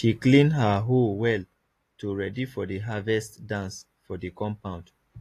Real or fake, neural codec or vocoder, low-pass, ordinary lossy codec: real; none; 14.4 kHz; AAC, 48 kbps